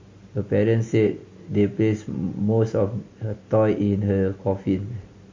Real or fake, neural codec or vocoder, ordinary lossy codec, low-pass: real; none; MP3, 32 kbps; 7.2 kHz